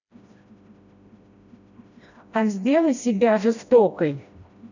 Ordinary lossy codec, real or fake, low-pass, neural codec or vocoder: none; fake; 7.2 kHz; codec, 16 kHz, 1 kbps, FreqCodec, smaller model